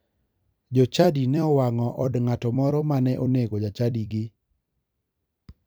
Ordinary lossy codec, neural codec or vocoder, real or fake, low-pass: none; vocoder, 44.1 kHz, 128 mel bands every 256 samples, BigVGAN v2; fake; none